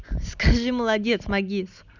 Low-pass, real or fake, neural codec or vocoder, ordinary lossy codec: 7.2 kHz; real; none; none